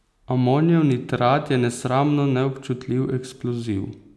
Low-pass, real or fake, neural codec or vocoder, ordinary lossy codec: none; real; none; none